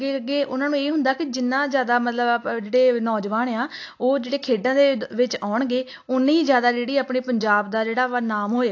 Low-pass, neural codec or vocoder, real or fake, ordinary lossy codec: 7.2 kHz; none; real; AAC, 48 kbps